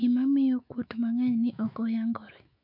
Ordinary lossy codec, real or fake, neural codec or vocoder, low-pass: none; fake; autoencoder, 48 kHz, 128 numbers a frame, DAC-VAE, trained on Japanese speech; 5.4 kHz